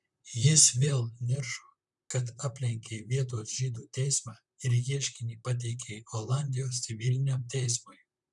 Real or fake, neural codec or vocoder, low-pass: fake; vocoder, 44.1 kHz, 128 mel bands, Pupu-Vocoder; 10.8 kHz